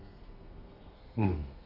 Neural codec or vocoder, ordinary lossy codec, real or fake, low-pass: codec, 32 kHz, 1.9 kbps, SNAC; none; fake; 5.4 kHz